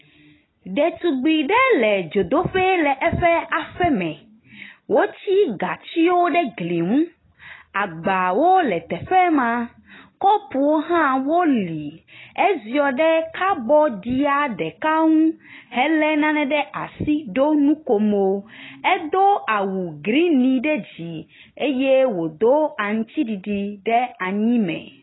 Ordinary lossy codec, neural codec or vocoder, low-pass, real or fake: AAC, 16 kbps; none; 7.2 kHz; real